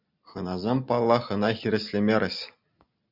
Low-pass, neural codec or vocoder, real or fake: 5.4 kHz; none; real